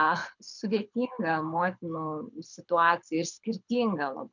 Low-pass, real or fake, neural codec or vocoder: 7.2 kHz; fake; codec, 24 kHz, 6 kbps, HILCodec